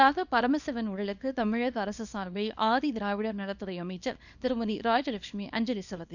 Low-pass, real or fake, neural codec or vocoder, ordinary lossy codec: 7.2 kHz; fake; codec, 24 kHz, 0.9 kbps, WavTokenizer, small release; none